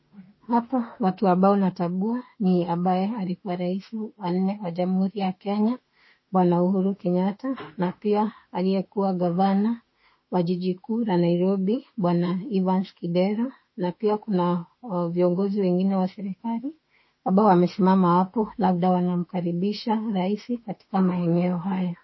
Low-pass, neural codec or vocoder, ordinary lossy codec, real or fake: 7.2 kHz; autoencoder, 48 kHz, 32 numbers a frame, DAC-VAE, trained on Japanese speech; MP3, 24 kbps; fake